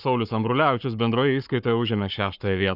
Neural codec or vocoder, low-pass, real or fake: codec, 44.1 kHz, 7.8 kbps, Pupu-Codec; 5.4 kHz; fake